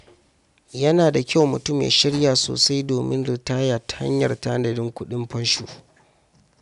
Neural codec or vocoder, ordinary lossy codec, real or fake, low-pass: none; none; real; 10.8 kHz